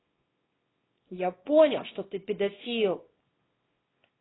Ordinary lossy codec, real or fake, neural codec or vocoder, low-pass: AAC, 16 kbps; fake; codec, 24 kHz, 0.9 kbps, WavTokenizer, small release; 7.2 kHz